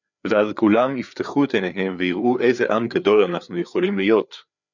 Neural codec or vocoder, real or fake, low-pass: codec, 16 kHz, 4 kbps, FreqCodec, larger model; fake; 7.2 kHz